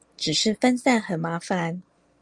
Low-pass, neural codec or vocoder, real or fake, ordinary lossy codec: 10.8 kHz; none; real; Opus, 32 kbps